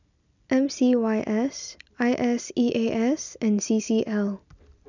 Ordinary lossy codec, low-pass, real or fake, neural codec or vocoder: none; 7.2 kHz; real; none